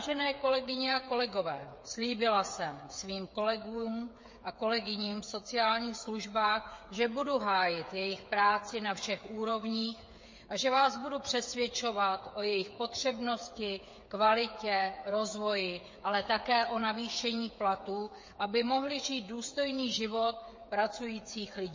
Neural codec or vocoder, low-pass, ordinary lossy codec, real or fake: codec, 16 kHz, 8 kbps, FreqCodec, smaller model; 7.2 kHz; MP3, 32 kbps; fake